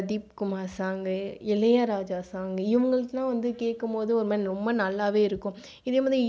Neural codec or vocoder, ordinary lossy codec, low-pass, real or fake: none; none; none; real